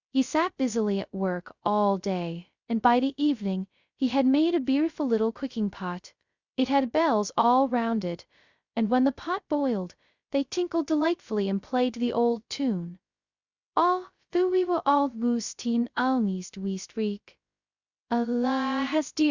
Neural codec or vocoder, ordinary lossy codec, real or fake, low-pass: codec, 16 kHz, 0.2 kbps, FocalCodec; Opus, 64 kbps; fake; 7.2 kHz